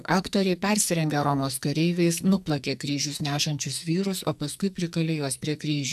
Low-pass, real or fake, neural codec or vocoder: 14.4 kHz; fake; codec, 44.1 kHz, 2.6 kbps, SNAC